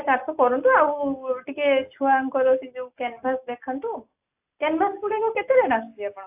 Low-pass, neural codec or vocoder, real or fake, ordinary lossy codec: 3.6 kHz; none; real; AAC, 32 kbps